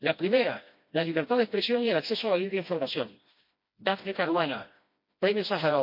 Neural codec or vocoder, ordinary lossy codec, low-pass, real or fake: codec, 16 kHz, 1 kbps, FreqCodec, smaller model; MP3, 32 kbps; 5.4 kHz; fake